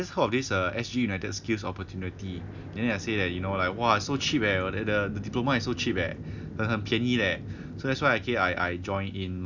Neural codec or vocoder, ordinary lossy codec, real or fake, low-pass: none; none; real; 7.2 kHz